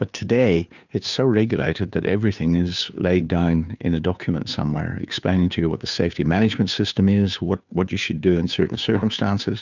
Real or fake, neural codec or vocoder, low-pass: fake; codec, 16 kHz, 2 kbps, FunCodec, trained on Chinese and English, 25 frames a second; 7.2 kHz